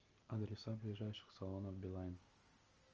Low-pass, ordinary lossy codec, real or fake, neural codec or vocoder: 7.2 kHz; Opus, 24 kbps; real; none